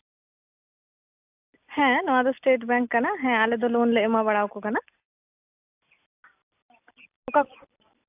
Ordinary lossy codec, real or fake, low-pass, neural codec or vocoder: AAC, 32 kbps; real; 3.6 kHz; none